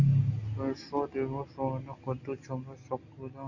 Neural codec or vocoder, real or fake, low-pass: none; real; 7.2 kHz